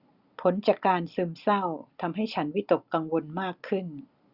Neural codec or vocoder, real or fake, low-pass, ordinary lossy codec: none; real; 5.4 kHz; Opus, 64 kbps